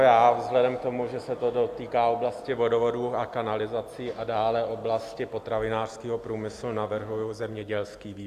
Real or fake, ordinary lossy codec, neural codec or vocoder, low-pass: real; Opus, 64 kbps; none; 14.4 kHz